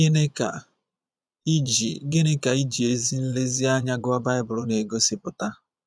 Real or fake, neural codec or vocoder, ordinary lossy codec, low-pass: fake; vocoder, 22.05 kHz, 80 mel bands, Vocos; none; none